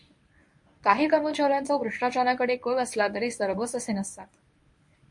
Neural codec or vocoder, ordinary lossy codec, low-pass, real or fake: codec, 24 kHz, 0.9 kbps, WavTokenizer, medium speech release version 1; MP3, 48 kbps; 10.8 kHz; fake